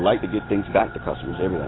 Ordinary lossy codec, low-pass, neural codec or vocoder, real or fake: AAC, 16 kbps; 7.2 kHz; none; real